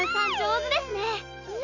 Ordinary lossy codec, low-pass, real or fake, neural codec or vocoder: none; 7.2 kHz; real; none